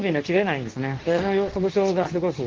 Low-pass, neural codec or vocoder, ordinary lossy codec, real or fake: 7.2 kHz; codec, 24 kHz, 0.9 kbps, WavTokenizer, medium speech release version 2; Opus, 16 kbps; fake